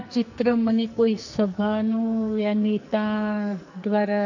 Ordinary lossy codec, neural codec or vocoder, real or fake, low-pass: none; codec, 44.1 kHz, 2.6 kbps, SNAC; fake; 7.2 kHz